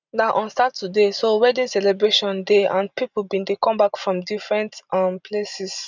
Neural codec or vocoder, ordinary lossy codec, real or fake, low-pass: none; none; real; 7.2 kHz